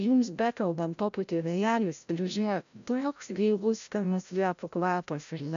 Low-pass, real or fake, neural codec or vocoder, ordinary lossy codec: 7.2 kHz; fake; codec, 16 kHz, 0.5 kbps, FreqCodec, larger model; AAC, 96 kbps